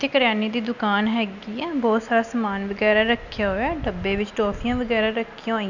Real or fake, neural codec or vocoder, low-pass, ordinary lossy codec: real; none; 7.2 kHz; none